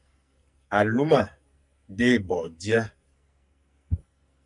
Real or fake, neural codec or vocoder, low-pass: fake; codec, 44.1 kHz, 2.6 kbps, SNAC; 10.8 kHz